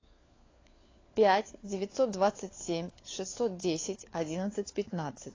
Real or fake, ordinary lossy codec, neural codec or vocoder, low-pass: fake; AAC, 32 kbps; codec, 16 kHz, 4 kbps, FunCodec, trained on LibriTTS, 50 frames a second; 7.2 kHz